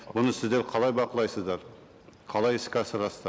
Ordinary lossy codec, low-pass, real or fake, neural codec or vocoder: none; none; real; none